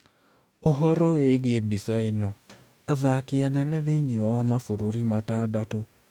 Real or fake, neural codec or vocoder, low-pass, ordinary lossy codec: fake; codec, 44.1 kHz, 2.6 kbps, DAC; 19.8 kHz; none